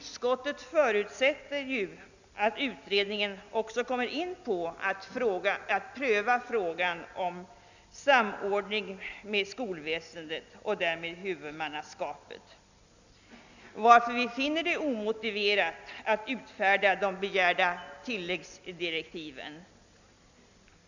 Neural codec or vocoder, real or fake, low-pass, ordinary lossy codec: none; real; 7.2 kHz; none